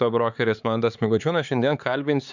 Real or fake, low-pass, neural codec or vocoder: fake; 7.2 kHz; codec, 16 kHz, 4 kbps, X-Codec, HuBERT features, trained on LibriSpeech